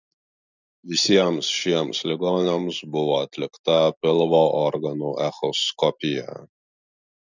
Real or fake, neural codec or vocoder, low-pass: real; none; 7.2 kHz